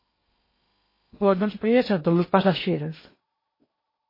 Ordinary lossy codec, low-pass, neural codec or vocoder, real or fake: MP3, 24 kbps; 5.4 kHz; codec, 16 kHz in and 24 kHz out, 0.8 kbps, FocalCodec, streaming, 65536 codes; fake